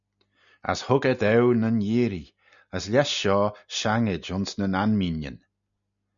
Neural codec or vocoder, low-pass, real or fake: none; 7.2 kHz; real